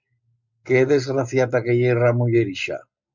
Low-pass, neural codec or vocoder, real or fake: 7.2 kHz; none; real